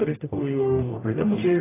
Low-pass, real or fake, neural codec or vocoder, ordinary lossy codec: 3.6 kHz; fake; codec, 44.1 kHz, 0.9 kbps, DAC; AAC, 16 kbps